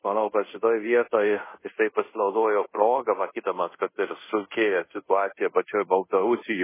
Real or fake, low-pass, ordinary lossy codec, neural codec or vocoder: fake; 3.6 kHz; MP3, 16 kbps; codec, 24 kHz, 0.5 kbps, DualCodec